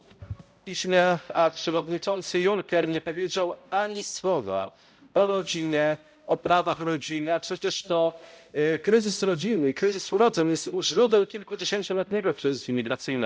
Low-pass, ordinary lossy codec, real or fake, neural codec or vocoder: none; none; fake; codec, 16 kHz, 0.5 kbps, X-Codec, HuBERT features, trained on balanced general audio